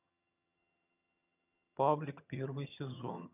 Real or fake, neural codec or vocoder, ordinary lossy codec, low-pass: fake; vocoder, 22.05 kHz, 80 mel bands, HiFi-GAN; none; 3.6 kHz